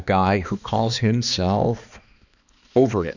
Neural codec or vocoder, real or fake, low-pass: codec, 16 kHz, 2 kbps, X-Codec, HuBERT features, trained on balanced general audio; fake; 7.2 kHz